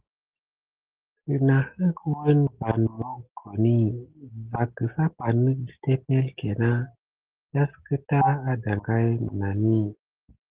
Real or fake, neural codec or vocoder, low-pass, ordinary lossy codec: real; none; 3.6 kHz; Opus, 16 kbps